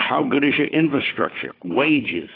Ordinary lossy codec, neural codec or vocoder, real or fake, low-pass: AAC, 24 kbps; codec, 16 kHz, 4 kbps, FunCodec, trained on Chinese and English, 50 frames a second; fake; 5.4 kHz